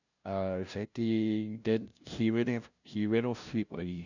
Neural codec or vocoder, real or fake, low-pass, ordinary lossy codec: codec, 16 kHz, 0.5 kbps, FunCodec, trained on LibriTTS, 25 frames a second; fake; 7.2 kHz; none